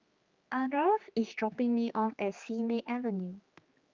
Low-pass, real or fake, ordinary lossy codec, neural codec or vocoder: 7.2 kHz; fake; Opus, 24 kbps; codec, 16 kHz, 2 kbps, X-Codec, HuBERT features, trained on general audio